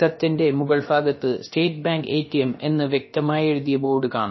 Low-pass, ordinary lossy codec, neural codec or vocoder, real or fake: 7.2 kHz; MP3, 24 kbps; codec, 16 kHz, about 1 kbps, DyCAST, with the encoder's durations; fake